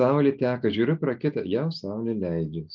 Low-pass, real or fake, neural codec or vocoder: 7.2 kHz; real; none